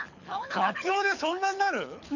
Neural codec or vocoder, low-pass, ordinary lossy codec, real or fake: codec, 24 kHz, 6 kbps, HILCodec; 7.2 kHz; none; fake